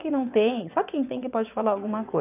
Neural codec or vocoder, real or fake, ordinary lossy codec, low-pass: vocoder, 22.05 kHz, 80 mel bands, WaveNeXt; fake; none; 3.6 kHz